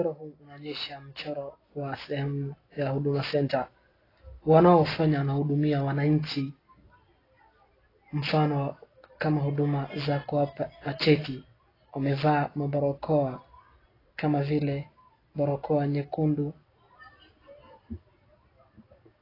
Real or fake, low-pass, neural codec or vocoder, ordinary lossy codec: real; 5.4 kHz; none; AAC, 24 kbps